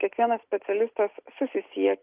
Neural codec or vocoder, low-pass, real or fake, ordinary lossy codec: none; 3.6 kHz; real; Opus, 32 kbps